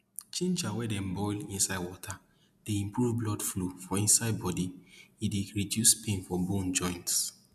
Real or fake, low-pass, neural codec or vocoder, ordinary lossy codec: real; 14.4 kHz; none; none